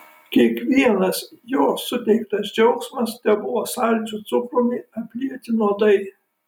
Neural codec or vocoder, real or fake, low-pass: none; real; 19.8 kHz